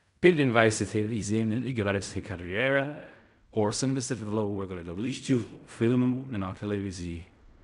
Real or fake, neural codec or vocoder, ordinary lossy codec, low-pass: fake; codec, 16 kHz in and 24 kHz out, 0.4 kbps, LongCat-Audio-Codec, fine tuned four codebook decoder; none; 10.8 kHz